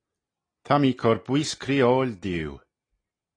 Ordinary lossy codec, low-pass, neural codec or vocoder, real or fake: AAC, 32 kbps; 9.9 kHz; none; real